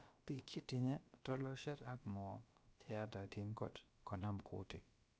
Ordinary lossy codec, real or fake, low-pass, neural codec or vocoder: none; fake; none; codec, 16 kHz, about 1 kbps, DyCAST, with the encoder's durations